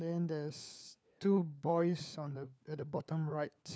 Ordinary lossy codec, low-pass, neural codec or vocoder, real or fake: none; none; codec, 16 kHz, 4 kbps, FunCodec, trained on LibriTTS, 50 frames a second; fake